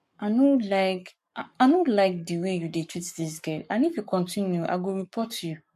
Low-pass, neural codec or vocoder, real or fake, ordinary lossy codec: 14.4 kHz; codec, 44.1 kHz, 7.8 kbps, Pupu-Codec; fake; MP3, 64 kbps